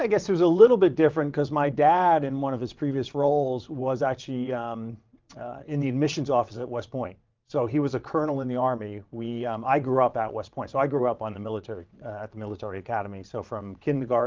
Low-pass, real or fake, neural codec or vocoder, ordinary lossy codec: 7.2 kHz; fake; vocoder, 22.05 kHz, 80 mel bands, WaveNeXt; Opus, 24 kbps